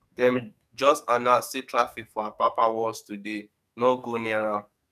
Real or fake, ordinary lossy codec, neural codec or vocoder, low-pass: fake; none; codec, 44.1 kHz, 2.6 kbps, SNAC; 14.4 kHz